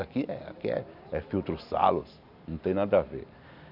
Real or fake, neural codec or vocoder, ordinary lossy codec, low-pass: real; none; none; 5.4 kHz